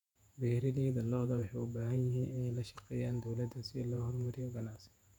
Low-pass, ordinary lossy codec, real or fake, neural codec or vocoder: 19.8 kHz; none; fake; vocoder, 48 kHz, 128 mel bands, Vocos